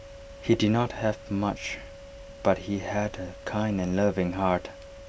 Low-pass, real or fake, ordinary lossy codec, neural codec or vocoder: none; real; none; none